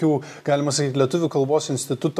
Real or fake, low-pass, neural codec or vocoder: real; 14.4 kHz; none